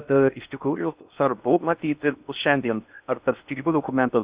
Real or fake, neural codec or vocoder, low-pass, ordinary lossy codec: fake; codec, 16 kHz in and 24 kHz out, 0.6 kbps, FocalCodec, streaming, 4096 codes; 3.6 kHz; Opus, 64 kbps